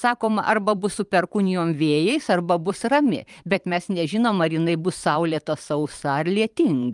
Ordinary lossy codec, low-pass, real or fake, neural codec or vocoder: Opus, 32 kbps; 10.8 kHz; fake; vocoder, 44.1 kHz, 128 mel bands every 512 samples, BigVGAN v2